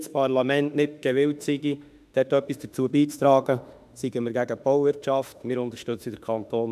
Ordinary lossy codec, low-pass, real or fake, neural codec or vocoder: none; 14.4 kHz; fake; autoencoder, 48 kHz, 32 numbers a frame, DAC-VAE, trained on Japanese speech